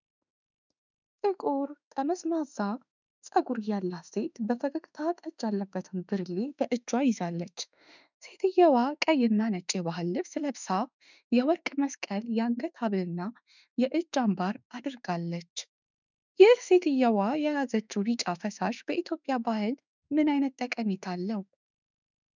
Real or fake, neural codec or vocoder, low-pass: fake; autoencoder, 48 kHz, 32 numbers a frame, DAC-VAE, trained on Japanese speech; 7.2 kHz